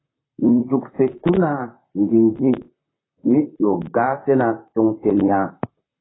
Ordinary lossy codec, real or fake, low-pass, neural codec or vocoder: AAC, 16 kbps; fake; 7.2 kHz; vocoder, 44.1 kHz, 128 mel bands, Pupu-Vocoder